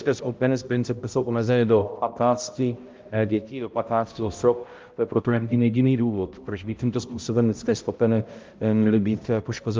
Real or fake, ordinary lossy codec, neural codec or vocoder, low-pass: fake; Opus, 24 kbps; codec, 16 kHz, 0.5 kbps, X-Codec, HuBERT features, trained on balanced general audio; 7.2 kHz